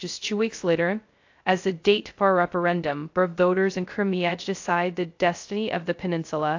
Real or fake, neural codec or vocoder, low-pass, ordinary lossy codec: fake; codec, 16 kHz, 0.2 kbps, FocalCodec; 7.2 kHz; AAC, 48 kbps